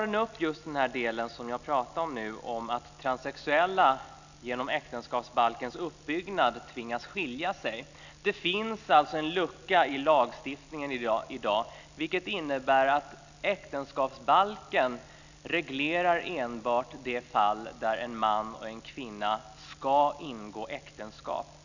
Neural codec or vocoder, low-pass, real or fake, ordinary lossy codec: none; 7.2 kHz; real; none